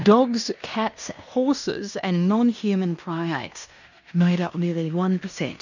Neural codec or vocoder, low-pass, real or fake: codec, 16 kHz in and 24 kHz out, 0.9 kbps, LongCat-Audio-Codec, fine tuned four codebook decoder; 7.2 kHz; fake